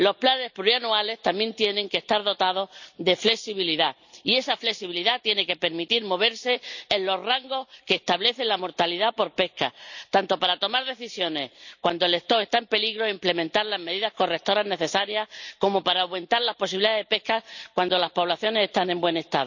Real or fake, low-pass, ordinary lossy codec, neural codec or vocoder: real; 7.2 kHz; none; none